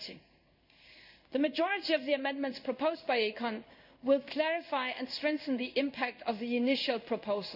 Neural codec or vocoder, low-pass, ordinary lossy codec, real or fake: codec, 16 kHz in and 24 kHz out, 1 kbps, XY-Tokenizer; 5.4 kHz; none; fake